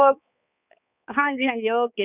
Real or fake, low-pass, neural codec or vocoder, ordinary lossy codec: fake; 3.6 kHz; codec, 16 kHz, 4 kbps, X-Codec, HuBERT features, trained on balanced general audio; none